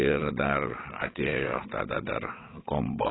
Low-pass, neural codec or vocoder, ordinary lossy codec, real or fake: 7.2 kHz; vocoder, 22.05 kHz, 80 mel bands, WaveNeXt; AAC, 16 kbps; fake